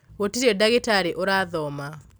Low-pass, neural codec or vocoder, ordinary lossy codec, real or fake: none; none; none; real